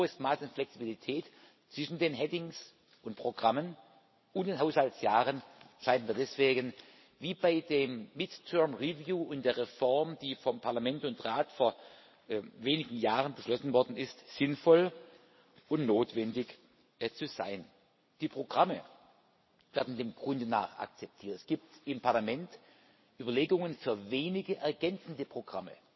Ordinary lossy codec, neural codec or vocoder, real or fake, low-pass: MP3, 24 kbps; none; real; 7.2 kHz